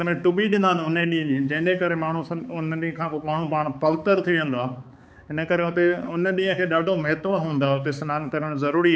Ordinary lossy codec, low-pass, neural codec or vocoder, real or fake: none; none; codec, 16 kHz, 4 kbps, X-Codec, HuBERT features, trained on balanced general audio; fake